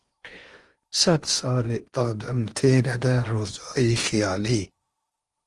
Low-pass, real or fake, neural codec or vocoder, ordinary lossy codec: 10.8 kHz; fake; codec, 16 kHz in and 24 kHz out, 0.8 kbps, FocalCodec, streaming, 65536 codes; Opus, 24 kbps